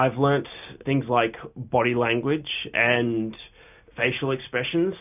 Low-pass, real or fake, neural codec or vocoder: 3.6 kHz; real; none